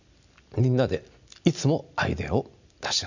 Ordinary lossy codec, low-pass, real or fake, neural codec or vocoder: none; 7.2 kHz; real; none